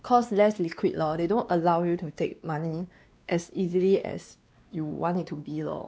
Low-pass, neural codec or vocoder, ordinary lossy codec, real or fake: none; codec, 16 kHz, 2 kbps, X-Codec, WavLM features, trained on Multilingual LibriSpeech; none; fake